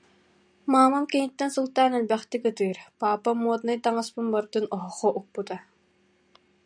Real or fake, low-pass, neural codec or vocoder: real; 9.9 kHz; none